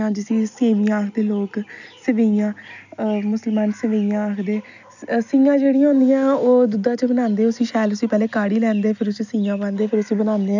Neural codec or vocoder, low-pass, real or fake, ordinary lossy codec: none; 7.2 kHz; real; none